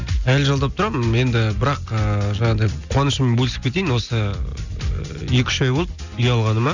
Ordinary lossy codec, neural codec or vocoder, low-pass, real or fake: none; none; 7.2 kHz; real